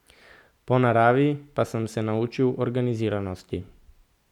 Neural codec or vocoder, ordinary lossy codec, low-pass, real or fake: none; none; 19.8 kHz; real